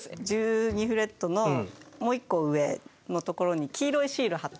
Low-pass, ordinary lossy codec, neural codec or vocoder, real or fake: none; none; none; real